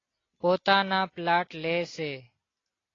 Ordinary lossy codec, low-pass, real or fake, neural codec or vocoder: AAC, 32 kbps; 7.2 kHz; real; none